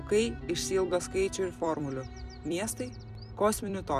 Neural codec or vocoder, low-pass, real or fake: vocoder, 44.1 kHz, 128 mel bands every 256 samples, BigVGAN v2; 14.4 kHz; fake